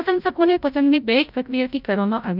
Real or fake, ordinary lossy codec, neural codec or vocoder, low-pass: fake; none; codec, 16 kHz, 0.5 kbps, FreqCodec, larger model; 5.4 kHz